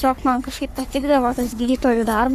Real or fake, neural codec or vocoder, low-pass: fake; codec, 44.1 kHz, 3.4 kbps, Pupu-Codec; 14.4 kHz